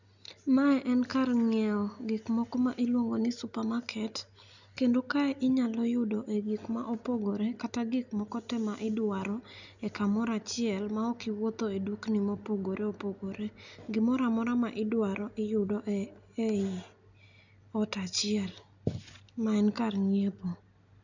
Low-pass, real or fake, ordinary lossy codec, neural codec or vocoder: 7.2 kHz; real; none; none